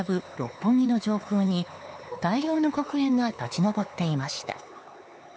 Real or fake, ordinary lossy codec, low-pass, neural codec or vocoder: fake; none; none; codec, 16 kHz, 4 kbps, X-Codec, HuBERT features, trained on LibriSpeech